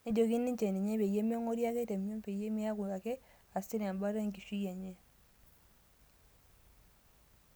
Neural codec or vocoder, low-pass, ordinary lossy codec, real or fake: none; none; none; real